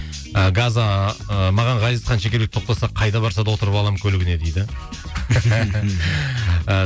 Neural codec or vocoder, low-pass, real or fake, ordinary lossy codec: none; none; real; none